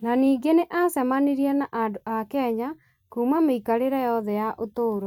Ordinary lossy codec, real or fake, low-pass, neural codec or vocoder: none; fake; 19.8 kHz; vocoder, 44.1 kHz, 128 mel bands every 256 samples, BigVGAN v2